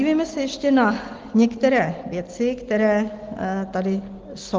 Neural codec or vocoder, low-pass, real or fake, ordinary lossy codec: none; 7.2 kHz; real; Opus, 16 kbps